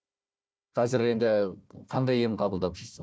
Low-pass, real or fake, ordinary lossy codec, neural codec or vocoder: none; fake; none; codec, 16 kHz, 1 kbps, FunCodec, trained on Chinese and English, 50 frames a second